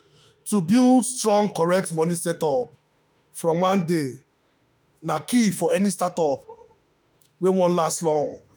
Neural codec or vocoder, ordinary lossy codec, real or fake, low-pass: autoencoder, 48 kHz, 32 numbers a frame, DAC-VAE, trained on Japanese speech; none; fake; none